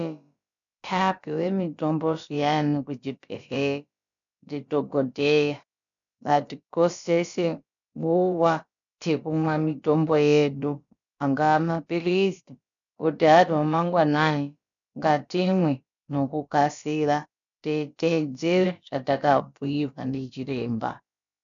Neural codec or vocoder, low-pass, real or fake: codec, 16 kHz, about 1 kbps, DyCAST, with the encoder's durations; 7.2 kHz; fake